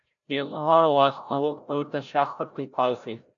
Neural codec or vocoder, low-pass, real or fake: codec, 16 kHz, 0.5 kbps, FreqCodec, larger model; 7.2 kHz; fake